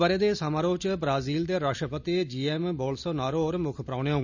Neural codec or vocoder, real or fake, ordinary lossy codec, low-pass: none; real; none; 7.2 kHz